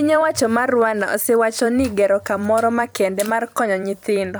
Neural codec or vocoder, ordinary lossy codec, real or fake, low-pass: vocoder, 44.1 kHz, 128 mel bands every 256 samples, BigVGAN v2; none; fake; none